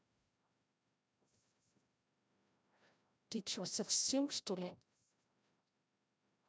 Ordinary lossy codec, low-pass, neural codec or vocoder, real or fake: none; none; codec, 16 kHz, 0.5 kbps, FreqCodec, larger model; fake